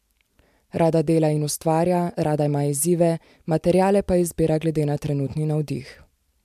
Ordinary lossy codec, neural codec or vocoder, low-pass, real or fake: MP3, 96 kbps; none; 14.4 kHz; real